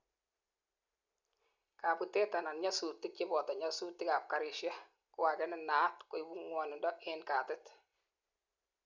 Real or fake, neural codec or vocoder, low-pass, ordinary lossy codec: real; none; 7.2 kHz; none